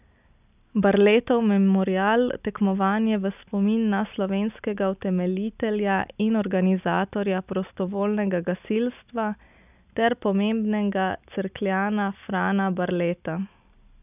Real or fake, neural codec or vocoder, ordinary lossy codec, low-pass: real; none; none; 3.6 kHz